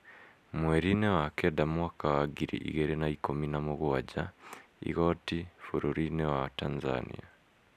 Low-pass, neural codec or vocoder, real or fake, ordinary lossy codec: 14.4 kHz; none; real; none